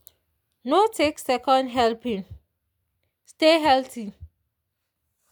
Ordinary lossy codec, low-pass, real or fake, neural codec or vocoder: none; none; real; none